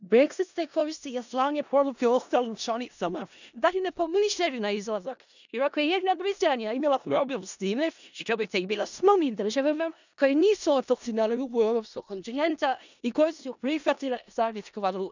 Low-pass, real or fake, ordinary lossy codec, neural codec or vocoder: 7.2 kHz; fake; none; codec, 16 kHz in and 24 kHz out, 0.4 kbps, LongCat-Audio-Codec, four codebook decoder